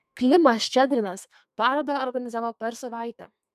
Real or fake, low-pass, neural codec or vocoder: fake; 14.4 kHz; codec, 32 kHz, 1.9 kbps, SNAC